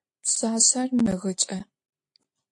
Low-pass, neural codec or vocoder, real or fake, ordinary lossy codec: 10.8 kHz; none; real; AAC, 64 kbps